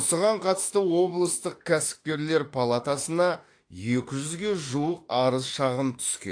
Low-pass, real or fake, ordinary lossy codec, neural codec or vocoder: 9.9 kHz; fake; AAC, 48 kbps; autoencoder, 48 kHz, 32 numbers a frame, DAC-VAE, trained on Japanese speech